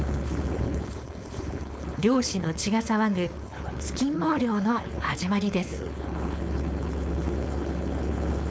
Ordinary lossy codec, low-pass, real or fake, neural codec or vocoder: none; none; fake; codec, 16 kHz, 4.8 kbps, FACodec